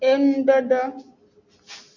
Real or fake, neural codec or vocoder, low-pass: real; none; 7.2 kHz